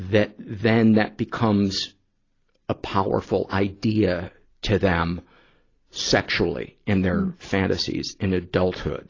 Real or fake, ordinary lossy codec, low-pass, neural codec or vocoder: real; AAC, 32 kbps; 7.2 kHz; none